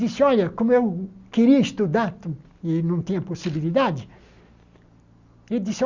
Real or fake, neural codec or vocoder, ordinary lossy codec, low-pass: real; none; Opus, 64 kbps; 7.2 kHz